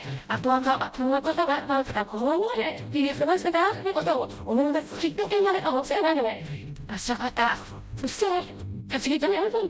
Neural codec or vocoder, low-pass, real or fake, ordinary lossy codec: codec, 16 kHz, 0.5 kbps, FreqCodec, smaller model; none; fake; none